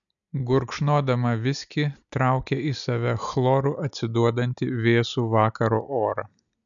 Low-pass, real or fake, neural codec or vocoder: 7.2 kHz; real; none